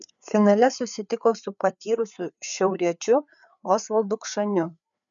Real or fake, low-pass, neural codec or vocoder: fake; 7.2 kHz; codec, 16 kHz, 4 kbps, FreqCodec, larger model